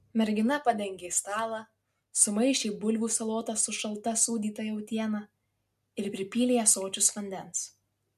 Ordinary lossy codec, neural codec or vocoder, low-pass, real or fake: MP3, 64 kbps; none; 14.4 kHz; real